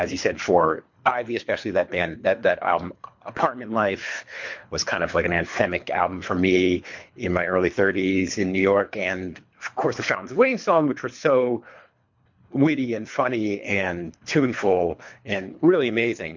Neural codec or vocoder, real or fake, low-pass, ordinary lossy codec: codec, 24 kHz, 3 kbps, HILCodec; fake; 7.2 kHz; MP3, 48 kbps